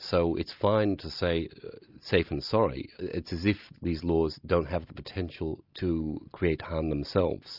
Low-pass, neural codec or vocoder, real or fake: 5.4 kHz; none; real